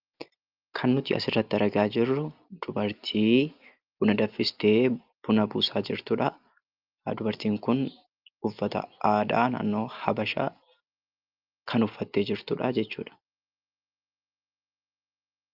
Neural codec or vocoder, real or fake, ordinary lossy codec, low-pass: none; real; Opus, 24 kbps; 5.4 kHz